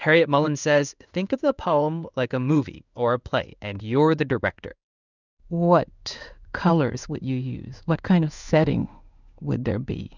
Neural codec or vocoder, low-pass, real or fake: codec, 16 kHz in and 24 kHz out, 1 kbps, XY-Tokenizer; 7.2 kHz; fake